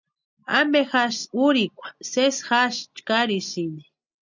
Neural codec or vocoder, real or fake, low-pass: none; real; 7.2 kHz